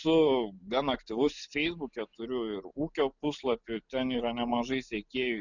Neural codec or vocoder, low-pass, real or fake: none; 7.2 kHz; real